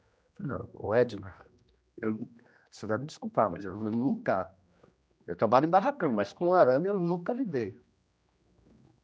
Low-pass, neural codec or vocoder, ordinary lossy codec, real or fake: none; codec, 16 kHz, 1 kbps, X-Codec, HuBERT features, trained on general audio; none; fake